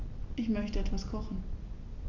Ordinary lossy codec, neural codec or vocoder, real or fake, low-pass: none; none; real; 7.2 kHz